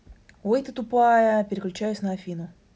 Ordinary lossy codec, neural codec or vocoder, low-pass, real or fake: none; none; none; real